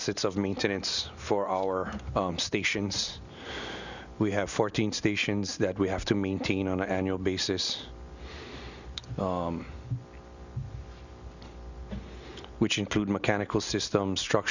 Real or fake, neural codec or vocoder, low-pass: real; none; 7.2 kHz